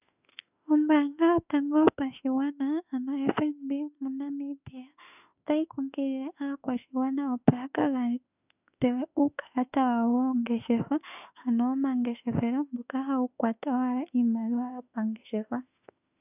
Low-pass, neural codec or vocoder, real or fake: 3.6 kHz; autoencoder, 48 kHz, 32 numbers a frame, DAC-VAE, trained on Japanese speech; fake